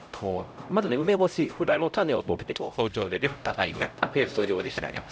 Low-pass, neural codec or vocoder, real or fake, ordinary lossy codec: none; codec, 16 kHz, 0.5 kbps, X-Codec, HuBERT features, trained on LibriSpeech; fake; none